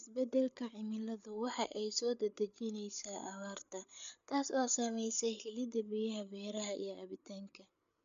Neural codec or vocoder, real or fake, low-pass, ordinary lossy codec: codec, 16 kHz, 8 kbps, FreqCodec, larger model; fake; 7.2 kHz; none